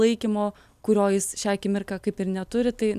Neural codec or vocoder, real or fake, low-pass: none; real; 14.4 kHz